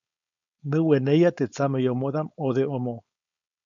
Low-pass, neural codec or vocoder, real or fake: 7.2 kHz; codec, 16 kHz, 4.8 kbps, FACodec; fake